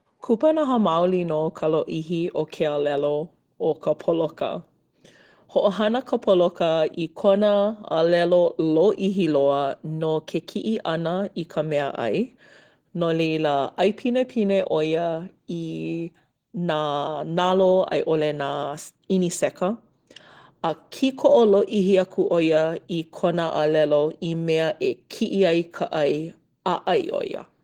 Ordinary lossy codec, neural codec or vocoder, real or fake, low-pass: Opus, 16 kbps; none; real; 19.8 kHz